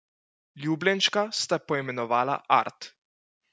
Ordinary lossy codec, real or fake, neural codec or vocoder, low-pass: none; real; none; none